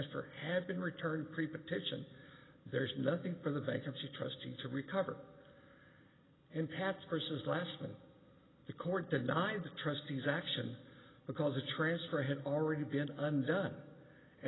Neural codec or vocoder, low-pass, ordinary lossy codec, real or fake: none; 7.2 kHz; AAC, 16 kbps; real